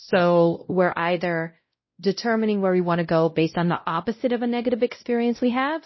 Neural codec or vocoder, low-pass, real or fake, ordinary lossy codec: codec, 16 kHz, 0.5 kbps, X-Codec, WavLM features, trained on Multilingual LibriSpeech; 7.2 kHz; fake; MP3, 24 kbps